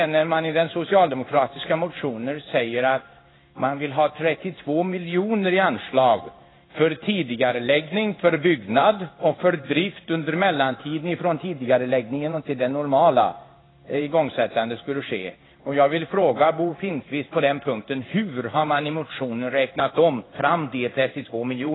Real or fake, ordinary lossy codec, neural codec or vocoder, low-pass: fake; AAC, 16 kbps; codec, 16 kHz in and 24 kHz out, 1 kbps, XY-Tokenizer; 7.2 kHz